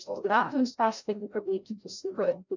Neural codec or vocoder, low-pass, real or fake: codec, 16 kHz, 0.5 kbps, FreqCodec, larger model; 7.2 kHz; fake